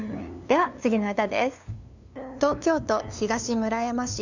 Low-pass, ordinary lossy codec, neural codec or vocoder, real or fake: 7.2 kHz; none; codec, 16 kHz, 2 kbps, FunCodec, trained on LibriTTS, 25 frames a second; fake